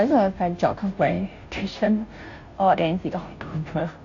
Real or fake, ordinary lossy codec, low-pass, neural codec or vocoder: fake; AAC, 48 kbps; 7.2 kHz; codec, 16 kHz, 0.5 kbps, FunCodec, trained on Chinese and English, 25 frames a second